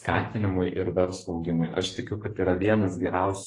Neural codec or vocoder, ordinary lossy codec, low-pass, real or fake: codec, 32 kHz, 1.9 kbps, SNAC; AAC, 32 kbps; 10.8 kHz; fake